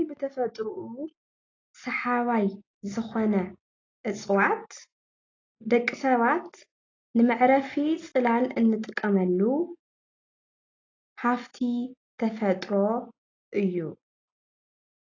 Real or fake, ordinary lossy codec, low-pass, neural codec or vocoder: real; AAC, 32 kbps; 7.2 kHz; none